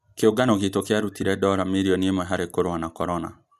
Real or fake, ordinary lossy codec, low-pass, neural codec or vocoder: real; none; 14.4 kHz; none